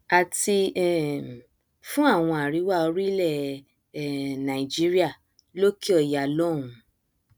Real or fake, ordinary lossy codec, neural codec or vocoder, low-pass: real; none; none; none